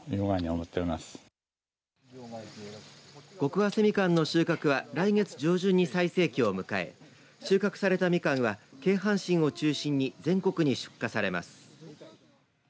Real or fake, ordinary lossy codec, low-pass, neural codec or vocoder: real; none; none; none